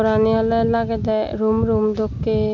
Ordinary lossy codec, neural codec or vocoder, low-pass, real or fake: none; none; 7.2 kHz; real